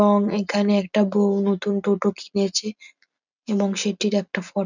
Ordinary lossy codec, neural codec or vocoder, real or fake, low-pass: none; none; real; 7.2 kHz